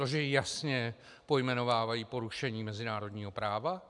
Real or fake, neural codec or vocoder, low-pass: real; none; 10.8 kHz